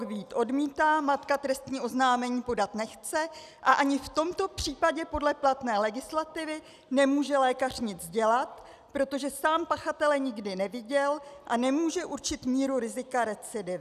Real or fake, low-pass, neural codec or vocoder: real; 14.4 kHz; none